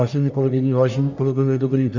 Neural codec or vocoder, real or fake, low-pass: codec, 44.1 kHz, 1.7 kbps, Pupu-Codec; fake; 7.2 kHz